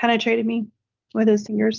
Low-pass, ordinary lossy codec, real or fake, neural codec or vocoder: 7.2 kHz; Opus, 32 kbps; real; none